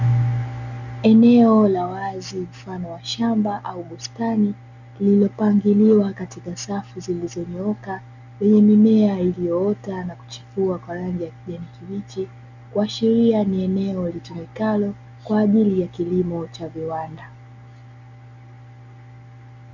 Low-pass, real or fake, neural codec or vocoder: 7.2 kHz; real; none